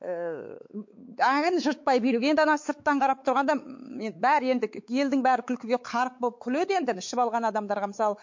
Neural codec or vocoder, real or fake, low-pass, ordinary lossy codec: codec, 16 kHz, 4 kbps, X-Codec, WavLM features, trained on Multilingual LibriSpeech; fake; 7.2 kHz; MP3, 48 kbps